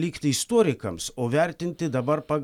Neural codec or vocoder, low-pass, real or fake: none; 19.8 kHz; real